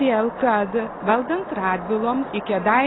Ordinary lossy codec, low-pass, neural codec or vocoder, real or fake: AAC, 16 kbps; 7.2 kHz; none; real